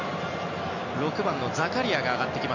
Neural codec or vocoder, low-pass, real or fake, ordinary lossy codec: none; 7.2 kHz; real; none